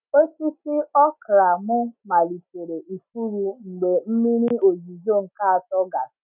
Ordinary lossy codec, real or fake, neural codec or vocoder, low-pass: none; real; none; 3.6 kHz